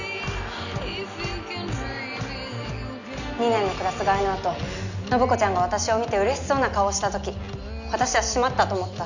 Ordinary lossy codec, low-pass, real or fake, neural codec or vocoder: none; 7.2 kHz; real; none